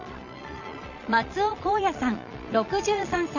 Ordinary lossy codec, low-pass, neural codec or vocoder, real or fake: none; 7.2 kHz; vocoder, 22.05 kHz, 80 mel bands, Vocos; fake